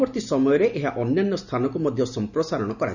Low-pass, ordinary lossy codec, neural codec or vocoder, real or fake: 7.2 kHz; none; none; real